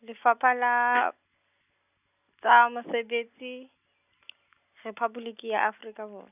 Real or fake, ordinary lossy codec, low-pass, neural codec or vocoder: real; none; 3.6 kHz; none